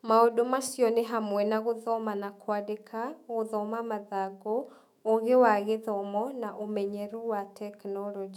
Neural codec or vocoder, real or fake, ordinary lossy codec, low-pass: autoencoder, 48 kHz, 128 numbers a frame, DAC-VAE, trained on Japanese speech; fake; none; 19.8 kHz